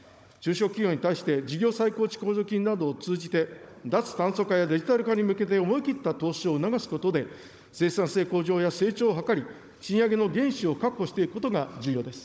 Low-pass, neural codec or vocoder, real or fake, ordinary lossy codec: none; codec, 16 kHz, 16 kbps, FunCodec, trained on Chinese and English, 50 frames a second; fake; none